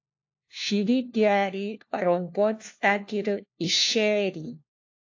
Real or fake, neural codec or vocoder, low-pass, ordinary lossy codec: fake; codec, 16 kHz, 1 kbps, FunCodec, trained on LibriTTS, 50 frames a second; 7.2 kHz; AAC, 48 kbps